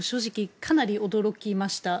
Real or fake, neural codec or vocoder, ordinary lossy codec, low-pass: real; none; none; none